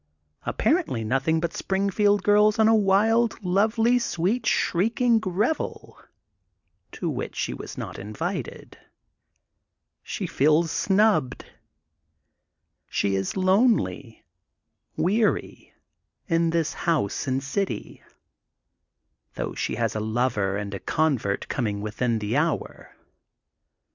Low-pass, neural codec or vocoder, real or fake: 7.2 kHz; none; real